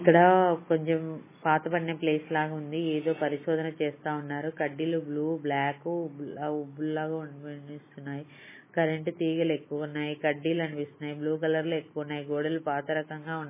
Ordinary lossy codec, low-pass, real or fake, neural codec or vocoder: MP3, 16 kbps; 3.6 kHz; real; none